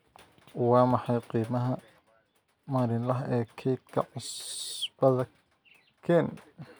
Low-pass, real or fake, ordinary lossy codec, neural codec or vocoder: none; real; none; none